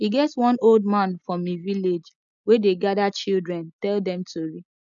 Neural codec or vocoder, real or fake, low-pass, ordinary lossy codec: none; real; 7.2 kHz; none